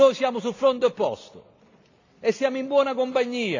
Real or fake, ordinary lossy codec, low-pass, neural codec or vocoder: real; AAC, 48 kbps; 7.2 kHz; none